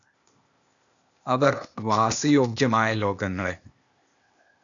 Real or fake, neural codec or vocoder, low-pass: fake; codec, 16 kHz, 0.8 kbps, ZipCodec; 7.2 kHz